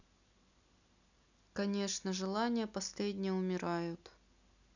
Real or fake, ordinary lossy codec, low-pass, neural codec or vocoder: real; none; 7.2 kHz; none